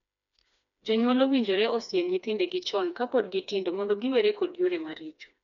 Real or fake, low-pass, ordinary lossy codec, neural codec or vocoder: fake; 7.2 kHz; none; codec, 16 kHz, 2 kbps, FreqCodec, smaller model